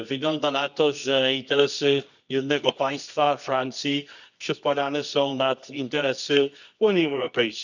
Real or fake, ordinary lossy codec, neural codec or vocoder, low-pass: fake; none; codec, 24 kHz, 0.9 kbps, WavTokenizer, medium music audio release; 7.2 kHz